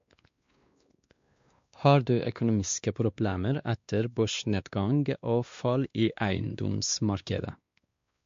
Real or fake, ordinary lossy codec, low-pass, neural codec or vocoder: fake; MP3, 48 kbps; 7.2 kHz; codec, 16 kHz, 2 kbps, X-Codec, WavLM features, trained on Multilingual LibriSpeech